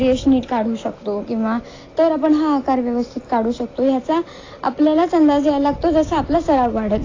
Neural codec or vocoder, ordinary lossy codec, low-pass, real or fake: none; AAC, 32 kbps; 7.2 kHz; real